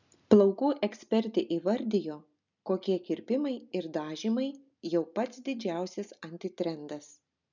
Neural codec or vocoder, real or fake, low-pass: none; real; 7.2 kHz